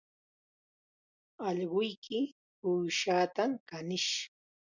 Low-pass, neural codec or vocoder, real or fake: 7.2 kHz; none; real